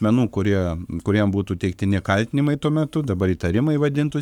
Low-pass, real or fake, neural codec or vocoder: 19.8 kHz; fake; codec, 44.1 kHz, 7.8 kbps, Pupu-Codec